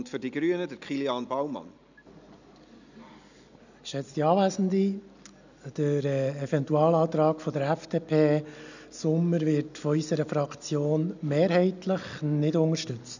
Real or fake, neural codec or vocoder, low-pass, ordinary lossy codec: real; none; 7.2 kHz; none